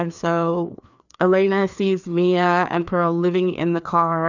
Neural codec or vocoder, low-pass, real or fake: codec, 16 kHz, 2 kbps, FreqCodec, larger model; 7.2 kHz; fake